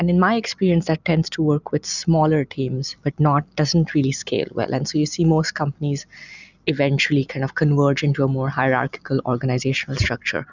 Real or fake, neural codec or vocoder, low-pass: real; none; 7.2 kHz